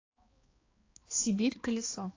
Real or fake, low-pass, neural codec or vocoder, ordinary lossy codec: fake; 7.2 kHz; codec, 16 kHz, 2 kbps, X-Codec, HuBERT features, trained on balanced general audio; AAC, 32 kbps